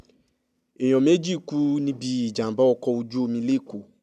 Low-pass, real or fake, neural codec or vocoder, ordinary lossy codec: 14.4 kHz; real; none; none